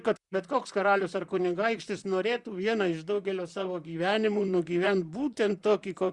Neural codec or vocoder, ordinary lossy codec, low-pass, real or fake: vocoder, 44.1 kHz, 128 mel bands, Pupu-Vocoder; Opus, 64 kbps; 10.8 kHz; fake